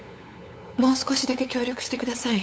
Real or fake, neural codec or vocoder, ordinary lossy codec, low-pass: fake; codec, 16 kHz, 8 kbps, FunCodec, trained on LibriTTS, 25 frames a second; none; none